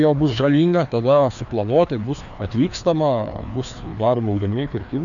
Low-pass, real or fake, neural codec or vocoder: 7.2 kHz; fake; codec, 16 kHz, 2 kbps, FreqCodec, larger model